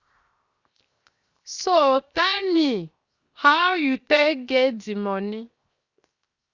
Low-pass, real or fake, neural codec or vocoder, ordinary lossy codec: 7.2 kHz; fake; codec, 16 kHz, 0.7 kbps, FocalCodec; Opus, 64 kbps